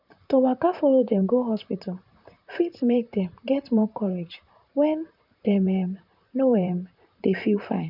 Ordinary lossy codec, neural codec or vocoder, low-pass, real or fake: none; vocoder, 44.1 kHz, 128 mel bands, Pupu-Vocoder; 5.4 kHz; fake